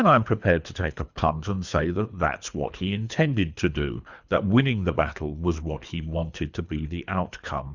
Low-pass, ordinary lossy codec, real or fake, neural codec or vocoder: 7.2 kHz; Opus, 64 kbps; fake; codec, 24 kHz, 3 kbps, HILCodec